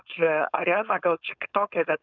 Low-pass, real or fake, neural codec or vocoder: 7.2 kHz; fake; codec, 16 kHz, 4.8 kbps, FACodec